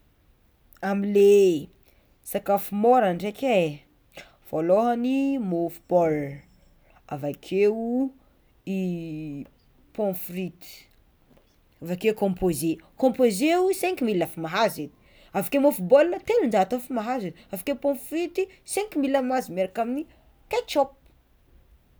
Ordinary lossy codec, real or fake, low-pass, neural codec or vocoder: none; real; none; none